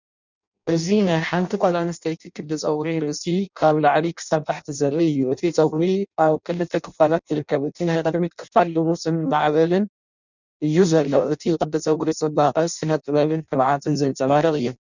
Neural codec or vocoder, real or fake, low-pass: codec, 16 kHz in and 24 kHz out, 0.6 kbps, FireRedTTS-2 codec; fake; 7.2 kHz